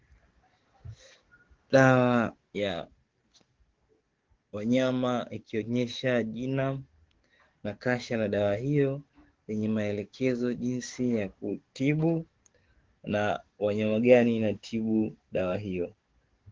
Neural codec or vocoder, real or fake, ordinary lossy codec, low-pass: codec, 44.1 kHz, 7.8 kbps, DAC; fake; Opus, 16 kbps; 7.2 kHz